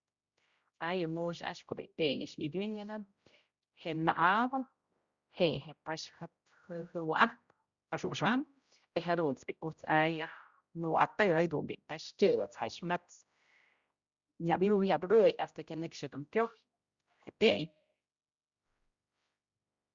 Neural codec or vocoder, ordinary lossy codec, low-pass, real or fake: codec, 16 kHz, 0.5 kbps, X-Codec, HuBERT features, trained on general audio; Opus, 64 kbps; 7.2 kHz; fake